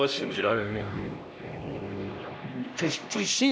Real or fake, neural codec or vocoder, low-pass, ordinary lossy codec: fake; codec, 16 kHz, 1 kbps, X-Codec, HuBERT features, trained on LibriSpeech; none; none